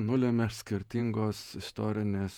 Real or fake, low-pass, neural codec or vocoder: fake; 19.8 kHz; vocoder, 48 kHz, 128 mel bands, Vocos